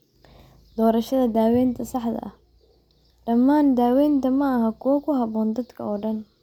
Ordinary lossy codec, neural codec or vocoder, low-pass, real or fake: none; none; 19.8 kHz; real